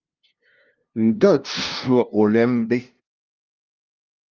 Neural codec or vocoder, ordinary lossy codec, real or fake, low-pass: codec, 16 kHz, 0.5 kbps, FunCodec, trained on LibriTTS, 25 frames a second; Opus, 24 kbps; fake; 7.2 kHz